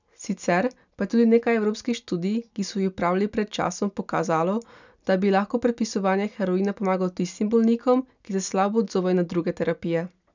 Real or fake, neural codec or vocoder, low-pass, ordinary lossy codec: real; none; 7.2 kHz; none